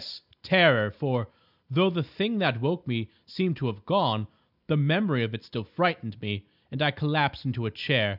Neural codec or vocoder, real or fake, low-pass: none; real; 5.4 kHz